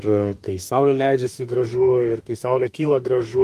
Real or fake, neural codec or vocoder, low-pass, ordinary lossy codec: fake; autoencoder, 48 kHz, 32 numbers a frame, DAC-VAE, trained on Japanese speech; 14.4 kHz; Opus, 32 kbps